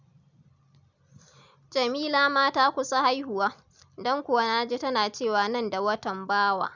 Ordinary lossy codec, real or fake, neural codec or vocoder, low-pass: none; real; none; 7.2 kHz